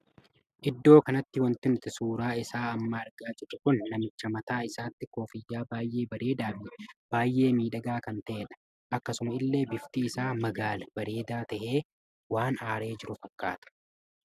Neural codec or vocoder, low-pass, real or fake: none; 14.4 kHz; real